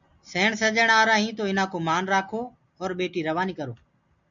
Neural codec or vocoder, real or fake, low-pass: none; real; 7.2 kHz